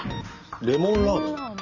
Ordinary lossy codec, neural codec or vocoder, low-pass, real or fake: none; none; 7.2 kHz; real